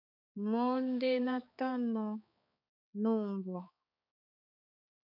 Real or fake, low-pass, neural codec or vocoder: fake; 5.4 kHz; codec, 16 kHz, 4 kbps, X-Codec, HuBERT features, trained on balanced general audio